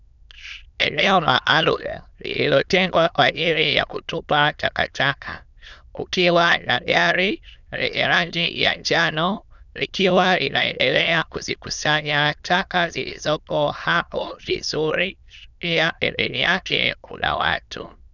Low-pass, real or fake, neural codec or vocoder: 7.2 kHz; fake; autoencoder, 22.05 kHz, a latent of 192 numbers a frame, VITS, trained on many speakers